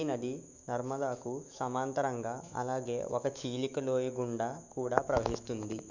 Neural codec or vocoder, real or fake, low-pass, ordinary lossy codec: autoencoder, 48 kHz, 128 numbers a frame, DAC-VAE, trained on Japanese speech; fake; 7.2 kHz; none